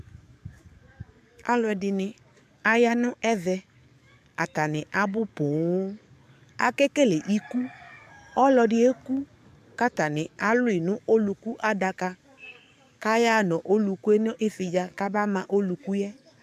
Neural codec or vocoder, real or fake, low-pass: codec, 44.1 kHz, 7.8 kbps, DAC; fake; 14.4 kHz